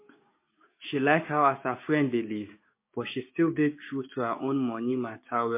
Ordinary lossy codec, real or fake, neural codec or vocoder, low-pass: MP3, 24 kbps; fake; codec, 16 kHz, 6 kbps, DAC; 3.6 kHz